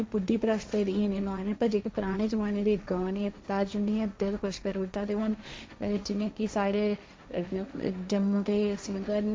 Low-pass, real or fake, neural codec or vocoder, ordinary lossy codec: none; fake; codec, 16 kHz, 1.1 kbps, Voila-Tokenizer; none